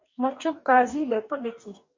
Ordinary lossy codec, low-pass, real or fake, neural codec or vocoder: MP3, 48 kbps; 7.2 kHz; fake; codec, 44.1 kHz, 2.6 kbps, DAC